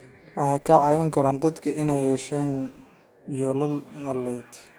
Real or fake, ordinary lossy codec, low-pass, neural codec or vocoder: fake; none; none; codec, 44.1 kHz, 2.6 kbps, DAC